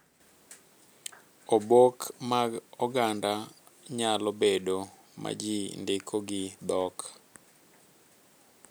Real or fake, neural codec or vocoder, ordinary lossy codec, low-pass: real; none; none; none